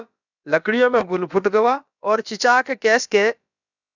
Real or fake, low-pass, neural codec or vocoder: fake; 7.2 kHz; codec, 16 kHz, about 1 kbps, DyCAST, with the encoder's durations